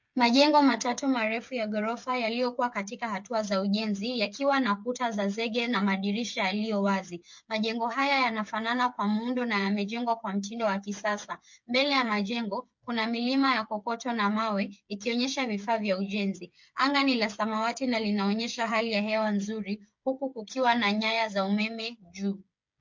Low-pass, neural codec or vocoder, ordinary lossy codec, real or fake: 7.2 kHz; codec, 16 kHz, 8 kbps, FreqCodec, smaller model; MP3, 48 kbps; fake